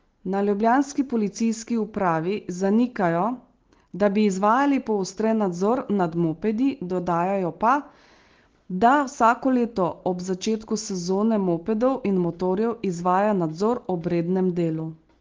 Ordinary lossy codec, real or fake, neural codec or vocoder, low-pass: Opus, 16 kbps; real; none; 7.2 kHz